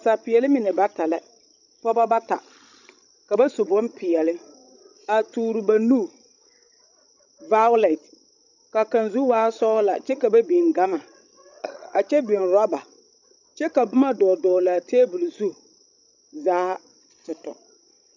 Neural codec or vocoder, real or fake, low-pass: codec, 16 kHz, 16 kbps, FreqCodec, larger model; fake; 7.2 kHz